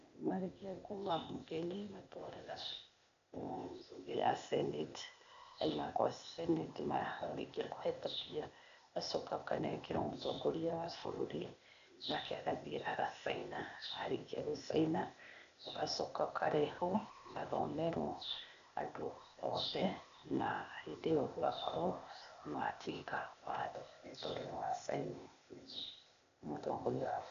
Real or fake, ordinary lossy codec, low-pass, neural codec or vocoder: fake; none; 7.2 kHz; codec, 16 kHz, 0.8 kbps, ZipCodec